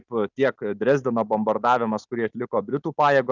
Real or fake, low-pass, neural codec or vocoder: real; 7.2 kHz; none